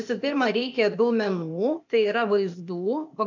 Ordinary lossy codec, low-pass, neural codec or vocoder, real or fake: MP3, 64 kbps; 7.2 kHz; codec, 16 kHz, 0.8 kbps, ZipCodec; fake